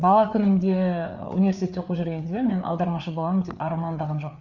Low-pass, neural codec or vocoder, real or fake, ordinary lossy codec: 7.2 kHz; codec, 16 kHz, 4 kbps, FreqCodec, larger model; fake; none